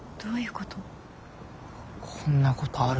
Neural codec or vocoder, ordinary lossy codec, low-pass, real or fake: none; none; none; real